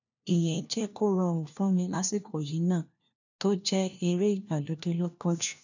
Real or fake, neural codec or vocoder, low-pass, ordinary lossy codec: fake; codec, 16 kHz, 1 kbps, FunCodec, trained on LibriTTS, 50 frames a second; 7.2 kHz; none